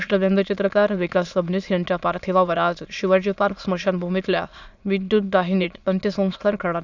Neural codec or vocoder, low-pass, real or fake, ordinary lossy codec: autoencoder, 22.05 kHz, a latent of 192 numbers a frame, VITS, trained on many speakers; 7.2 kHz; fake; none